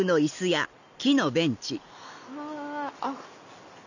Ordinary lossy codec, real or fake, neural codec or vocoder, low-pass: none; real; none; 7.2 kHz